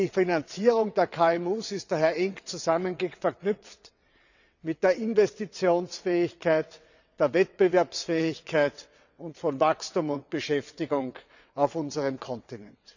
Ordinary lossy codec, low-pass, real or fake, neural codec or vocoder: none; 7.2 kHz; fake; vocoder, 22.05 kHz, 80 mel bands, WaveNeXt